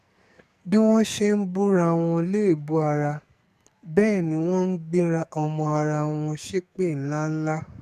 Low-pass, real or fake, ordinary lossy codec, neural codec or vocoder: 14.4 kHz; fake; none; codec, 44.1 kHz, 2.6 kbps, SNAC